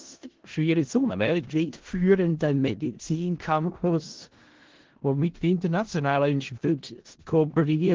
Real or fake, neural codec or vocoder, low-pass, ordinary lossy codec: fake; codec, 16 kHz in and 24 kHz out, 0.4 kbps, LongCat-Audio-Codec, four codebook decoder; 7.2 kHz; Opus, 16 kbps